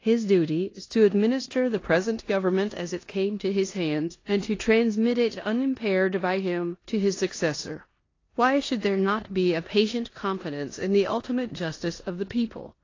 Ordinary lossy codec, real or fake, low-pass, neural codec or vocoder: AAC, 32 kbps; fake; 7.2 kHz; codec, 16 kHz in and 24 kHz out, 0.9 kbps, LongCat-Audio-Codec, four codebook decoder